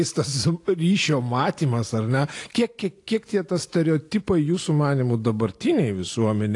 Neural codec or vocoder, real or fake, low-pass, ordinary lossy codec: none; real; 10.8 kHz; AAC, 48 kbps